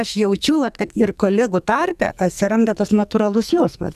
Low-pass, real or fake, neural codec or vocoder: 14.4 kHz; fake; codec, 44.1 kHz, 2.6 kbps, SNAC